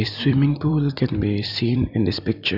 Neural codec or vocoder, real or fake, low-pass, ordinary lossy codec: vocoder, 44.1 kHz, 128 mel bands every 256 samples, BigVGAN v2; fake; 5.4 kHz; none